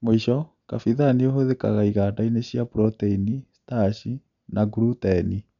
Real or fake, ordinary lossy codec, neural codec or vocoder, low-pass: real; none; none; 7.2 kHz